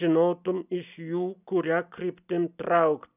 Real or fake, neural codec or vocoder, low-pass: real; none; 3.6 kHz